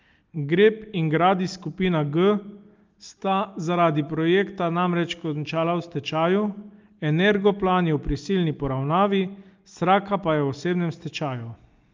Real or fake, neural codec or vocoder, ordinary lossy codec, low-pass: real; none; Opus, 32 kbps; 7.2 kHz